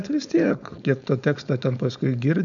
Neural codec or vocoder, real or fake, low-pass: codec, 16 kHz, 4.8 kbps, FACodec; fake; 7.2 kHz